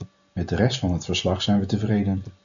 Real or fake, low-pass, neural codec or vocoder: real; 7.2 kHz; none